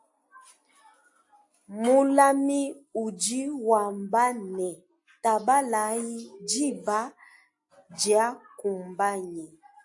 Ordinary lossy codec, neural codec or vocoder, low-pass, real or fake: AAC, 64 kbps; none; 10.8 kHz; real